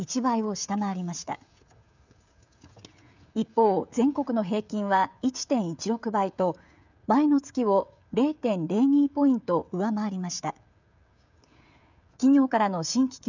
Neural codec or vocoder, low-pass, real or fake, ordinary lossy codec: codec, 16 kHz, 16 kbps, FreqCodec, smaller model; 7.2 kHz; fake; none